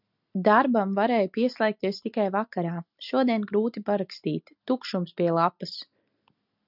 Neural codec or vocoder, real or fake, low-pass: none; real; 5.4 kHz